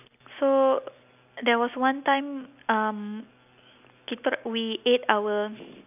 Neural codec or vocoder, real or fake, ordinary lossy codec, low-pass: none; real; none; 3.6 kHz